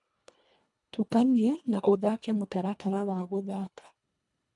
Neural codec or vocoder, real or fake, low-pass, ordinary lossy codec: codec, 24 kHz, 1.5 kbps, HILCodec; fake; 10.8 kHz; MP3, 96 kbps